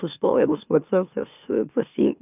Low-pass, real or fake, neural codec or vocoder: 3.6 kHz; fake; autoencoder, 44.1 kHz, a latent of 192 numbers a frame, MeloTTS